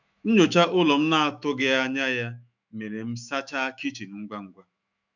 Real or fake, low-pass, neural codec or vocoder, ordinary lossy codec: fake; 7.2 kHz; autoencoder, 48 kHz, 128 numbers a frame, DAC-VAE, trained on Japanese speech; none